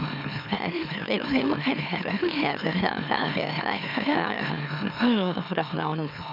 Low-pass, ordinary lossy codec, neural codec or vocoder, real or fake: 5.4 kHz; none; autoencoder, 44.1 kHz, a latent of 192 numbers a frame, MeloTTS; fake